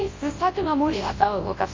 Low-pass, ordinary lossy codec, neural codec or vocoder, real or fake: 7.2 kHz; MP3, 32 kbps; codec, 24 kHz, 0.9 kbps, WavTokenizer, large speech release; fake